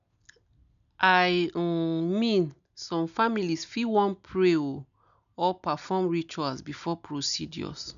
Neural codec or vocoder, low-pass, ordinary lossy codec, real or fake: none; 7.2 kHz; none; real